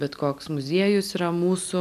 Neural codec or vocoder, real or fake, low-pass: none; real; 14.4 kHz